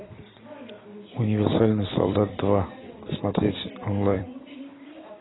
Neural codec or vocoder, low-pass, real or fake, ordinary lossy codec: vocoder, 44.1 kHz, 128 mel bands every 256 samples, BigVGAN v2; 7.2 kHz; fake; AAC, 16 kbps